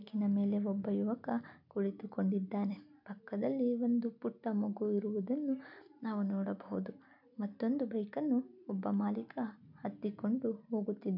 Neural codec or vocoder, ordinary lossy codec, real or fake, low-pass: none; none; real; 5.4 kHz